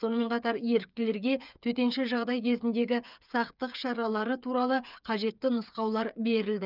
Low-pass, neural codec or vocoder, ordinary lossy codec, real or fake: 5.4 kHz; codec, 16 kHz, 8 kbps, FreqCodec, smaller model; none; fake